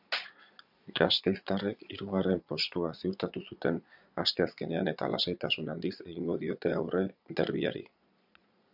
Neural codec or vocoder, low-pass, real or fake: vocoder, 22.05 kHz, 80 mel bands, Vocos; 5.4 kHz; fake